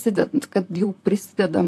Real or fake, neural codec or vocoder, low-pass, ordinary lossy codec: fake; vocoder, 44.1 kHz, 128 mel bands, Pupu-Vocoder; 14.4 kHz; AAC, 64 kbps